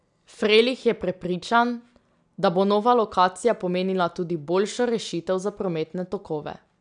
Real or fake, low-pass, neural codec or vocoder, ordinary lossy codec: real; 9.9 kHz; none; none